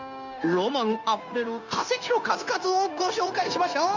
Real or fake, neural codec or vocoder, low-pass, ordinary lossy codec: fake; codec, 16 kHz, 0.9 kbps, LongCat-Audio-Codec; 7.2 kHz; none